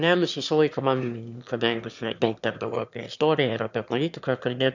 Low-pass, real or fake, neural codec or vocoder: 7.2 kHz; fake; autoencoder, 22.05 kHz, a latent of 192 numbers a frame, VITS, trained on one speaker